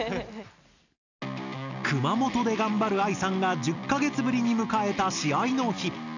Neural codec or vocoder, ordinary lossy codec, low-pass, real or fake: none; none; 7.2 kHz; real